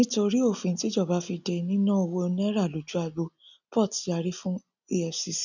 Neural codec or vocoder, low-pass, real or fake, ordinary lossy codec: none; 7.2 kHz; real; none